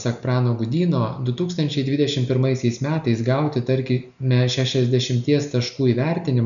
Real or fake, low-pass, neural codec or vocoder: real; 7.2 kHz; none